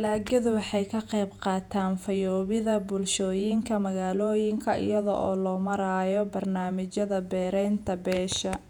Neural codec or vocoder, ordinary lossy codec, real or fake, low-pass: vocoder, 48 kHz, 128 mel bands, Vocos; none; fake; 19.8 kHz